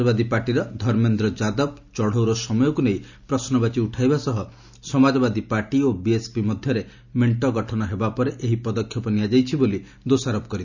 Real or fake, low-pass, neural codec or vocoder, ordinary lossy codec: real; 7.2 kHz; none; none